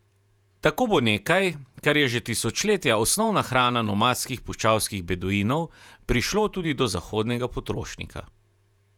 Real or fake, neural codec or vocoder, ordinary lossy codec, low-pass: fake; vocoder, 48 kHz, 128 mel bands, Vocos; none; 19.8 kHz